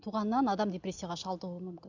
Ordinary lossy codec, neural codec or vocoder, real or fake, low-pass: none; none; real; 7.2 kHz